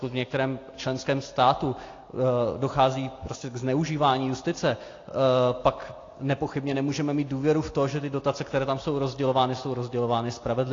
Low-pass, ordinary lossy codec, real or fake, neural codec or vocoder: 7.2 kHz; AAC, 32 kbps; real; none